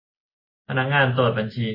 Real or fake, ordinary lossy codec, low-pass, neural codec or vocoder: real; MP3, 24 kbps; 5.4 kHz; none